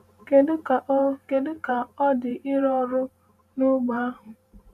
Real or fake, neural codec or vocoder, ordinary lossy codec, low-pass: fake; vocoder, 44.1 kHz, 128 mel bands every 512 samples, BigVGAN v2; none; 14.4 kHz